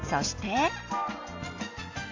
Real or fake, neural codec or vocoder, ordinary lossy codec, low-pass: real; none; AAC, 48 kbps; 7.2 kHz